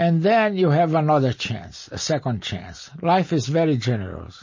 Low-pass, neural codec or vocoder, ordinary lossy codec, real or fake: 7.2 kHz; none; MP3, 32 kbps; real